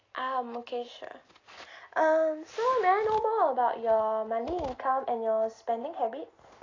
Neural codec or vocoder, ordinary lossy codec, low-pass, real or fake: none; AAC, 32 kbps; 7.2 kHz; real